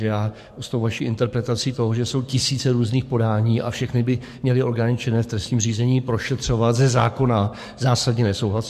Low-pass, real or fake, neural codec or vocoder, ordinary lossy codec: 14.4 kHz; fake; codec, 44.1 kHz, 7.8 kbps, DAC; MP3, 64 kbps